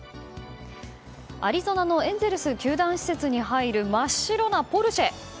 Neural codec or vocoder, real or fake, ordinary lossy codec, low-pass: none; real; none; none